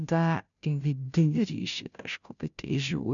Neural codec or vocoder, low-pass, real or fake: codec, 16 kHz, 0.5 kbps, FunCodec, trained on Chinese and English, 25 frames a second; 7.2 kHz; fake